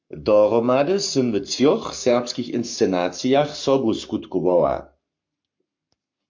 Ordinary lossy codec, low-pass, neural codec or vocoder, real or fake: MP3, 48 kbps; 7.2 kHz; codec, 44.1 kHz, 7.8 kbps, Pupu-Codec; fake